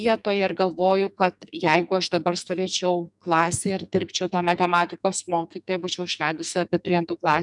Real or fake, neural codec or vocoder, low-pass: fake; codec, 44.1 kHz, 2.6 kbps, SNAC; 10.8 kHz